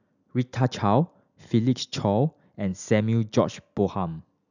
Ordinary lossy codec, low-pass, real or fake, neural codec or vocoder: none; 7.2 kHz; real; none